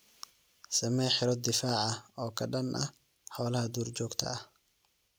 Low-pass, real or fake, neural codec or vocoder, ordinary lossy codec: none; real; none; none